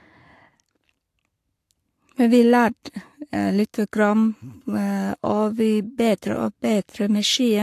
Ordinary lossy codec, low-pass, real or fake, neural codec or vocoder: AAC, 64 kbps; 14.4 kHz; fake; codec, 44.1 kHz, 7.8 kbps, Pupu-Codec